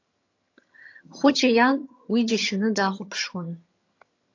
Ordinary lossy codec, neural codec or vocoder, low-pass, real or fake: AAC, 48 kbps; vocoder, 22.05 kHz, 80 mel bands, HiFi-GAN; 7.2 kHz; fake